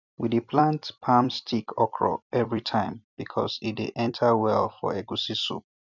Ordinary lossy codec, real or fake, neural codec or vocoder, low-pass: none; fake; vocoder, 44.1 kHz, 128 mel bands every 256 samples, BigVGAN v2; 7.2 kHz